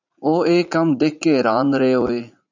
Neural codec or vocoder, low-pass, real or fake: vocoder, 44.1 kHz, 80 mel bands, Vocos; 7.2 kHz; fake